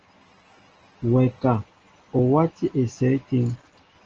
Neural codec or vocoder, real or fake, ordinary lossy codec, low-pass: none; real; Opus, 16 kbps; 7.2 kHz